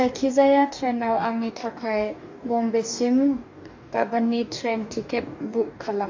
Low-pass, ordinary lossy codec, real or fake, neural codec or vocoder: 7.2 kHz; AAC, 48 kbps; fake; codec, 44.1 kHz, 2.6 kbps, DAC